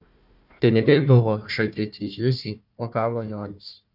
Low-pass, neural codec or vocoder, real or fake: 5.4 kHz; codec, 16 kHz, 1 kbps, FunCodec, trained on Chinese and English, 50 frames a second; fake